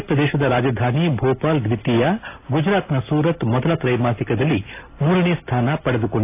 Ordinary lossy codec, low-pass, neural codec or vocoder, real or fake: AAC, 24 kbps; 3.6 kHz; none; real